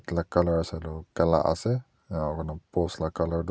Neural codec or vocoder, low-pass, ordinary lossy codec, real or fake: none; none; none; real